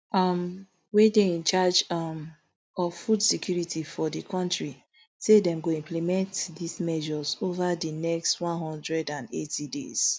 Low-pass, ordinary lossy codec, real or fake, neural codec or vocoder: none; none; real; none